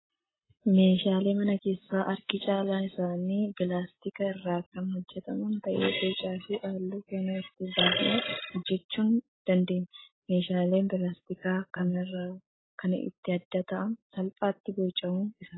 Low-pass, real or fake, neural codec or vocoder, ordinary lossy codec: 7.2 kHz; real; none; AAC, 16 kbps